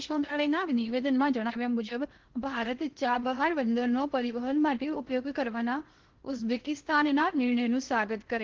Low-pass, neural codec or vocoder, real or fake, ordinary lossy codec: 7.2 kHz; codec, 16 kHz in and 24 kHz out, 0.8 kbps, FocalCodec, streaming, 65536 codes; fake; Opus, 16 kbps